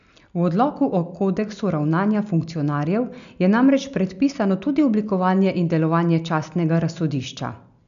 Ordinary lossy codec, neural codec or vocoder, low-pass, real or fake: none; none; 7.2 kHz; real